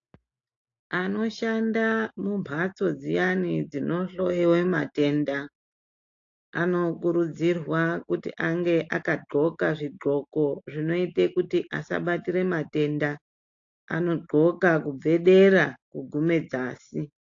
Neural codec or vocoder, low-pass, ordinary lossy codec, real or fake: none; 7.2 kHz; AAC, 48 kbps; real